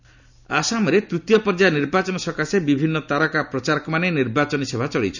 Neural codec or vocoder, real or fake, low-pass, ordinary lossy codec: none; real; 7.2 kHz; none